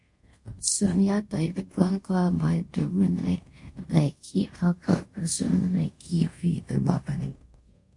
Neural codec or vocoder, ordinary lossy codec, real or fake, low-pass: codec, 24 kHz, 0.5 kbps, DualCodec; MP3, 48 kbps; fake; 10.8 kHz